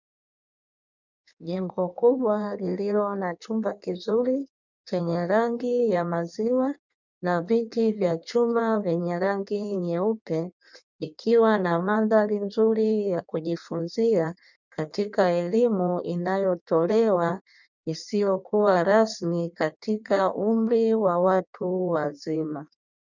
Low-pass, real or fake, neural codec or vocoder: 7.2 kHz; fake; codec, 16 kHz in and 24 kHz out, 1.1 kbps, FireRedTTS-2 codec